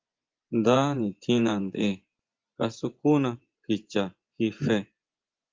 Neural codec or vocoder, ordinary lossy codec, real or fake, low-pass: vocoder, 24 kHz, 100 mel bands, Vocos; Opus, 32 kbps; fake; 7.2 kHz